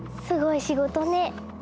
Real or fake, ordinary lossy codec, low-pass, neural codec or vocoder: real; none; none; none